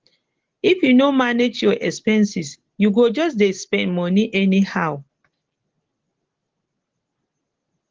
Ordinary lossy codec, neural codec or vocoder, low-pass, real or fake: Opus, 16 kbps; none; 7.2 kHz; real